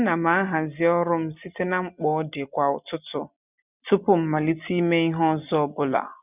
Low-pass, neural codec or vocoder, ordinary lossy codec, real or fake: 3.6 kHz; none; none; real